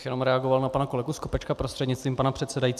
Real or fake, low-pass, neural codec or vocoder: real; 14.4 kHz; none